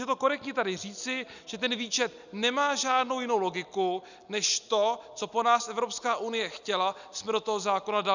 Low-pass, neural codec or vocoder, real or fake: 7.2 kHz; none; real